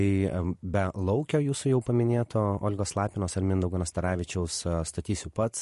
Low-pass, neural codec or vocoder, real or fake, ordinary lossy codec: 14.4 kHz; vocoder, 44.1 kHz, 128 mel bands every 512 samples, BigVGAN v2; fake; MP3, 48 kbps